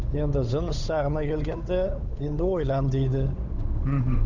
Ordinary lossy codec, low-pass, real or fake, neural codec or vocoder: none; 7.2 kHz; fake; codec, 16 kHz, 8 kbps, FunCodec, trained on Chinese and English, 25 frames a second